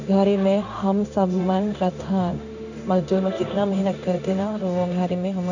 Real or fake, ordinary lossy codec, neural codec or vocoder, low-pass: fake; none; codec, 16 kHz in and 24 kHz out, 1 kbps, XY-Tokenizer; 7.2 kHz